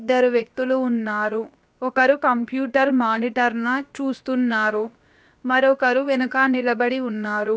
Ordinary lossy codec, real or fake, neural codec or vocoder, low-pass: none; fake; codec, 16 kHz, about 1 kbps, DyCAST, with the encoder's durations; none